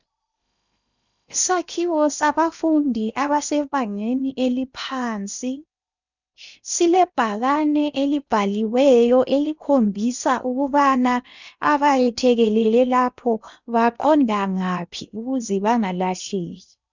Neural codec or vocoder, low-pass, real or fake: codec, 16 kHz in and 24 kHz out, 0.8 kbps, FocalCodec, streaming, 65536 codes; 7.2 kHz; fake